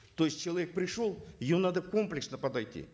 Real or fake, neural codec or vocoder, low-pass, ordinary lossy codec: real; none; none; none